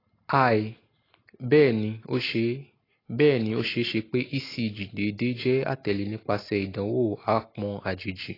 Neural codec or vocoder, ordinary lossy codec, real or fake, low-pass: none; AAC, 24 kbps; real; 5.4 kHz